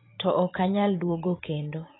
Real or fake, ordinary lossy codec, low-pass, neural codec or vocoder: fake; AAC, 16 kbps; 7.2 kHz; vocoder, 44.1 kHz, 128 mel bands every 512 samples, BigVGAN v2